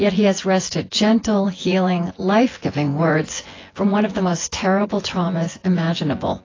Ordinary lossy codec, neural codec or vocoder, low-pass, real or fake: AAC, 32 kbps; vocoder, 24 kHz, 100 mel bands, Vocos; 7.2 kHz; fake